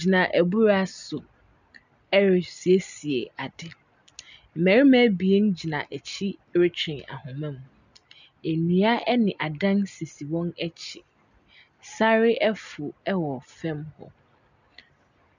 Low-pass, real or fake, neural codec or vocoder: 7.2 kHz; real; none